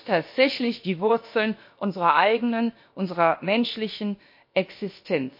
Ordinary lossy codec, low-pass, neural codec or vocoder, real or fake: MP3, 32 kbps; 5.4 kHz; codec, 16 kHz, about 1 kbps, DyCAST, with the encoder's durations; fake